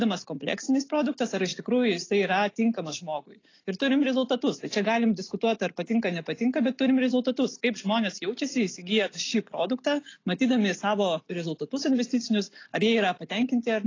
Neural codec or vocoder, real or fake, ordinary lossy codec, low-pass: none; real; AAC, 32 kbps; 7.2 kHz